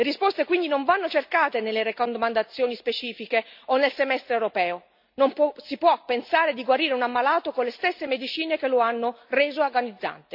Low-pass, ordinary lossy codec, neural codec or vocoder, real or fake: 5.4 kHz; MP3, 48 kbps; none; real